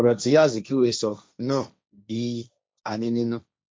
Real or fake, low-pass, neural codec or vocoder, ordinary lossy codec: fake; none; codec, 16 kHz, 1.1 kbps, Voila-Tokenizer; none